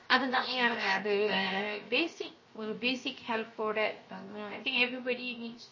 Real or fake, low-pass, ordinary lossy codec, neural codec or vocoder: fake; 7.2 kHz; MP3, 32 kbps; codec, 16 kHz, 0.7 kbps, FocalCodec